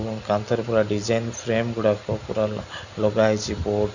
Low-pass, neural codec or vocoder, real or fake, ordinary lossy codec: 7.2 kHz; none; real; none